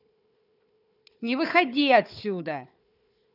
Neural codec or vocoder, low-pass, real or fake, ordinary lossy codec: codec, 16 kHz, 4 kbps, FunCodec, trained on Chinese and English, 50 frames a second; 5.4 kHz; fake; none